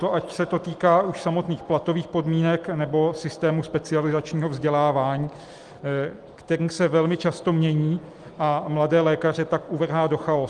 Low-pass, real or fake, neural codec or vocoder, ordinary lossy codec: 10.8 kHz; real; none; Opus, 24 kbps